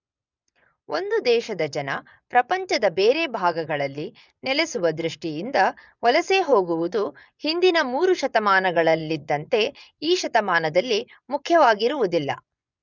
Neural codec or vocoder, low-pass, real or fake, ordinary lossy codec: vocoder, 24 kHz, 100 mel bands, Vocos; 7.2 kHz; fake; none